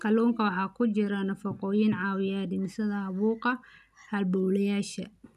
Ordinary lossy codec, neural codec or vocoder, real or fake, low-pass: none; none; real; 14.4 kHz